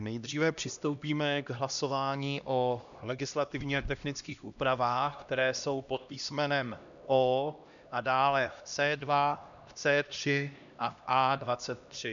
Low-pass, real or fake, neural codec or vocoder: 7.2 kHz; fake; codec, 16 kHz, 1 kbps, X-Codec, HuBERT features, trained on LibriSpeech